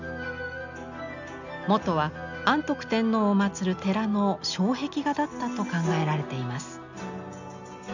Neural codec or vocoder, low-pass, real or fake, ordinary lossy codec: none; 7.2 kHz; real; none